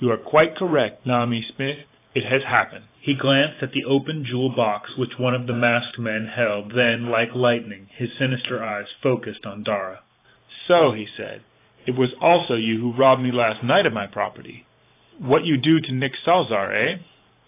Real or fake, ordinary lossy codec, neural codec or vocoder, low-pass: fake; AAC, 24 kbps; vocoder, 44.1 kHz, 128 mel bands every 512 samples, BigVGAN v2; 3.6 kHz